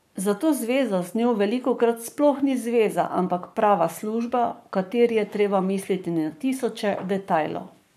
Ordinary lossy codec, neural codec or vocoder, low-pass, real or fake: none; codec, 44.1 kHz, 7.8 kbps, Pupu-Codec; 14.4 kHz; fake